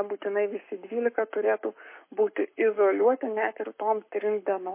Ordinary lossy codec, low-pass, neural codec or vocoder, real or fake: MP3, 24 kbps; 3.6 kHz; codec, 44.1 kHz, 7.8 kbps, Pupu-Codec; fake